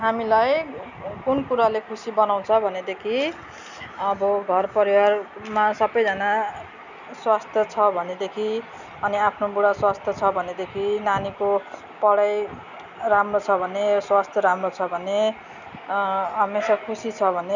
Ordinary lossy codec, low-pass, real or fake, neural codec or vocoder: none; 7.2 kHz; real; none